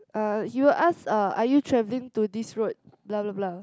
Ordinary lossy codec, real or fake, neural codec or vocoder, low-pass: none; real; none; none